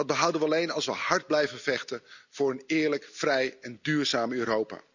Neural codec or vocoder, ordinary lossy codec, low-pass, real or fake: none; none; 7.2 kHz; real